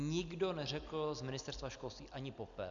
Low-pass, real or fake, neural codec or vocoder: 7.2 kHz; real; none